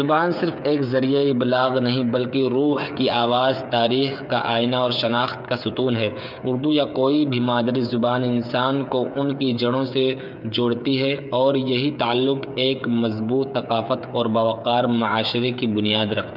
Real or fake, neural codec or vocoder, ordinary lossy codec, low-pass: fake; codec, 16 kHz, 8 kbps, FreqCodec, smaller model; none; 5.4 kHz